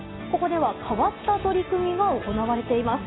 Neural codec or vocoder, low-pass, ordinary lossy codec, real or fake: none; 7.2 kHz; AAC, 16 kbps; real